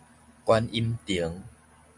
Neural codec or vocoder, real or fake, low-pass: none; real; 10.8 kHz